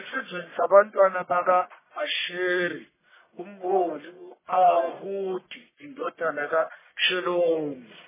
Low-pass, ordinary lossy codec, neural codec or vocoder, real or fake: 3.6 kHz; MP3, 16 kbps; codec, 44.1 kHz, 1.7 kbps, Pupu-Codec; fake